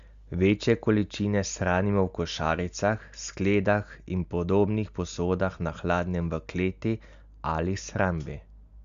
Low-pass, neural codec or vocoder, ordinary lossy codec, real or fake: 7.2 kHz; none; Opus, 64 kbps; real